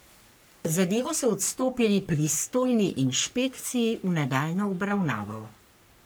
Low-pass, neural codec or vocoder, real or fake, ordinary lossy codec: none; codec, 44.1 kHz, 3.4 kbps, Pupu-Codec; fake; none